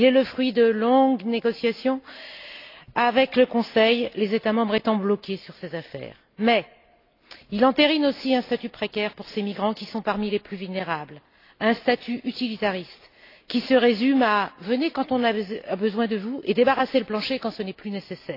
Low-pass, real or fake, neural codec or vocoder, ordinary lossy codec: 5.4 kHz; real; none; AAC, 32 kbps